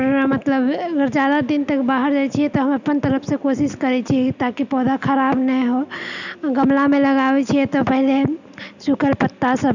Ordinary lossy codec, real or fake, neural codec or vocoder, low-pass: none; real; none; 7.2 kHz